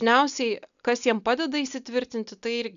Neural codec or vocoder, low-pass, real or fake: none; 7.2 kHz; real